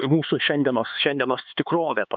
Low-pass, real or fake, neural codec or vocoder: 7.2 kHz; fake; codec, 16 kHz, 4 kbps, X-Codec, HuBERT features, trained on LibriSpeech